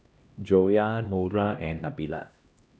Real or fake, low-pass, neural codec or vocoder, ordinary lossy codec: fake; none; codec, 16 kHz, 1 kbps, X-Codec, HuBERT features, trained on LibriSpeech; none